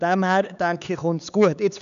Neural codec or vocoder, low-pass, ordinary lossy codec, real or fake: codec, 16 kHz, 4 kbps, X-Codec, HuBERT features, trained on LibriSpeech; 7.2 kHz; MP3, 96 kbps; fake